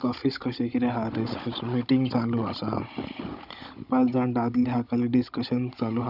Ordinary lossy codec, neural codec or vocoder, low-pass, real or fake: none; codec, 16 kHz, 16 kbps, FunCodec, trained on Chinese and English, 50 frames a second; 5.4 kHz; fake